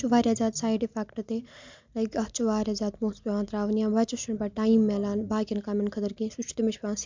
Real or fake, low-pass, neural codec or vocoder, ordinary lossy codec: real; 7.2 kHz; none; MP3, 64 kbps